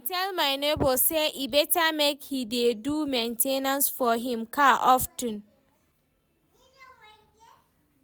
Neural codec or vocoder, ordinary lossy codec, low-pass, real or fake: none; none; none; real